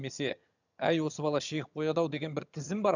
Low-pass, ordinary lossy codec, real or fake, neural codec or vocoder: 7.2 kHz; none; fake; vocoder, 22.05 kHz, 80 mel bands, HiFi-GAN